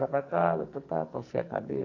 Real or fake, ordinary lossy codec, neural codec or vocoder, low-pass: fake; Opus, 64 kbps; codec, 44.1 kHz, 2.6 kbps, SNAC; 7.2 kHz